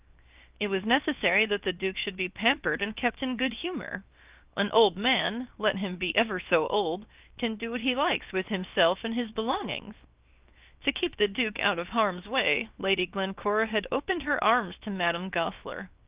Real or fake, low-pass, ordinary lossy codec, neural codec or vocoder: fake; 3.6 kHz; Opus, 24 kbps; codec, 16 kHz, 0.7 kbps, FocalCodec